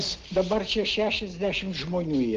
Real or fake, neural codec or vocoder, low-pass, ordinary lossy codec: real; none; 7.2 kHz; Opus, 16 kbps